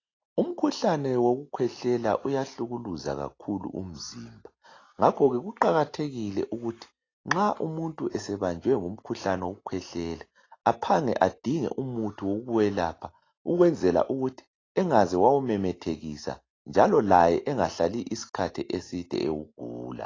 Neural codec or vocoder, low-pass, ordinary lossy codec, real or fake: none; 7.2 kHz; AAC, 32 kbps; real